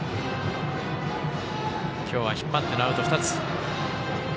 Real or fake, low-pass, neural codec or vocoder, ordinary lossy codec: real; none; none; none